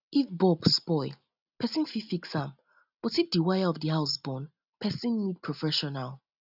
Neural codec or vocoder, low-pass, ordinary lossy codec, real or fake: none; 5.4 kHz; none; real